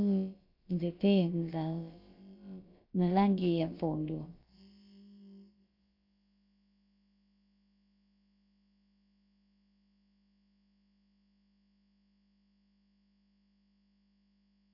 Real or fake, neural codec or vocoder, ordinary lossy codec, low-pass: fake; codec, 16 kHz, about 1 kbps, DyCAST, with the encoder's durations; AAC, 48 kbps; 5.4 kHz